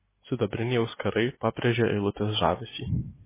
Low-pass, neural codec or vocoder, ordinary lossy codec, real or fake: 3.6 kHz; none; MP3, 16 kbps; real